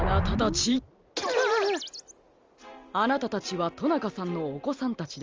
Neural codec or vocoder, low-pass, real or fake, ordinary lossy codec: none; 7.2 kHz; real; Opus, 32 kbps